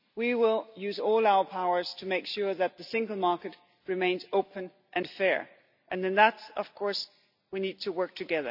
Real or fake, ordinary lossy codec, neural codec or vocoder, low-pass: real; none; none; 5.4 kHz